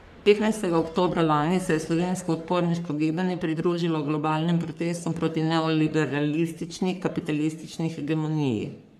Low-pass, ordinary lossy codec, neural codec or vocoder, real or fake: 14.4 kHz; none; codec, 44.1 kHz, 3.4 kbps, Pupu-Codec; fake